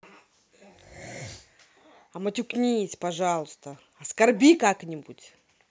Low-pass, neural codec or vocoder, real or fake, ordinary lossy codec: none; none; real; none